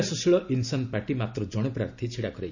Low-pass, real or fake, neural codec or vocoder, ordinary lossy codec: 7.2 kHz; real; none; none